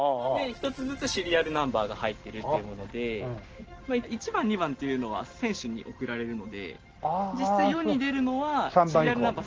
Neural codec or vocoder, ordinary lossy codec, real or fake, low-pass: none; Opus, 16 kbps; real; 7.2 kHz